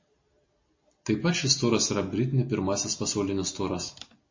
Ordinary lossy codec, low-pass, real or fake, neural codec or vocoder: MP3, 32 kbps; 7.2 kHz; real; none